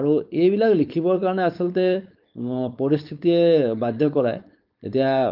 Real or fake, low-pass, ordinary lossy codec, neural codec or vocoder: fake; 5.4 kHz; Opus, 24 kbps; codec, 16 kHz, 4.8 kbps, FACodec